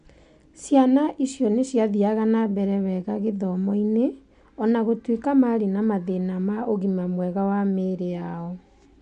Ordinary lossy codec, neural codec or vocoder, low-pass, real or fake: MP3, 64 kbps; none; 9.9 kHz; real